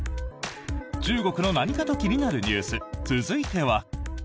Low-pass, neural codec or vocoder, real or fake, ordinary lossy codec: none; none; real; none